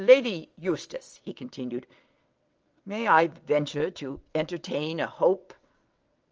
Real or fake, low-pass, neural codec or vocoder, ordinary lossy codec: fake; 7.2 kHz; vocoder, 22.05 kHz, 80 mel bands, WaveNeXt; Opus, 24 kbps